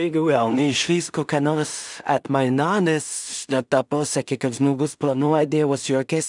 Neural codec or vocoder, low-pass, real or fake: codec, 16 kHz in and 24 kHz out, 0.4 kbps, LongCat-Audio-Codec, two codebook decoder; 10.8 kHz; fake